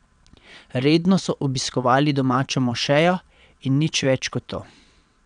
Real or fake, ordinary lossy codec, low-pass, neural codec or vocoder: fake; none; 9.9 kHz; vocoder, 22.05 kHz, 80 mel bands, WaveNeXt